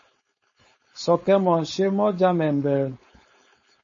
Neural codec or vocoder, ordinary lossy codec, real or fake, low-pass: codec, 16 kHz, 4.8 kbps, FACodec; MP3, 32 kbps; fake; 7.2 kHz